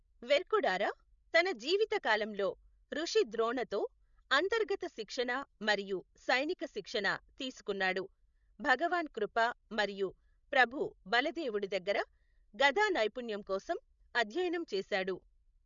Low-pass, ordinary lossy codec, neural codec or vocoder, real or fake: 7.2 kHz; none; codec, 16 kHz, 16 kbps, FreqCodec, larger model; fake